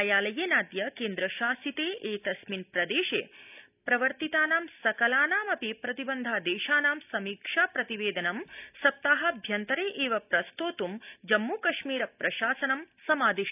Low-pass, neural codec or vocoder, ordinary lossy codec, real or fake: 3.6 kHz; none; none; real